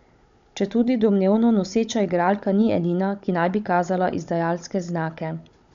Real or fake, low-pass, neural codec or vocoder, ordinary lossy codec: fake; 7.2 kHz; codec, 16 kHz, 16 kbps, FunCodec, trained on Chinese and English, 50 frames a second; MP3, 64 kbps